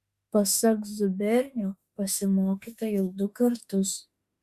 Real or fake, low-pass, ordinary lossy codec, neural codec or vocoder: fake; 14.4 kHz; Opus, 64 kbps; autoencoder, 48 kHz, 32 numbers a frame, DAC-VAE, trained on Japanese speech